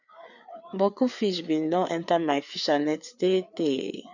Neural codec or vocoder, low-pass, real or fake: codec, 16 kHz, 4 kbps, FreqCodec, larger model; 7.2 kHz; fake